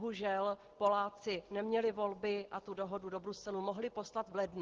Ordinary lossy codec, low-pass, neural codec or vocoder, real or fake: Opus, 16 kbps; 7.2 kHz; none; real